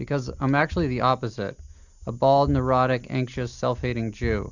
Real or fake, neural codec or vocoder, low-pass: real; none; 7.2 kHz